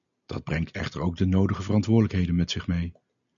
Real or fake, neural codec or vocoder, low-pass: real; none; 7.2 kHz